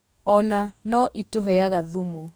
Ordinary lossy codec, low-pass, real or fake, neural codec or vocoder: none; none; fake; codec, 44.1 kHz, 2.6 kbps, DAC